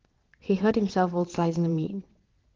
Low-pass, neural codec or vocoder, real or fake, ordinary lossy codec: 7.2 kHz; vocoder, 22.05 kHz, 80 mel bands, WaveNeXt; fake; Opus, 16 kbps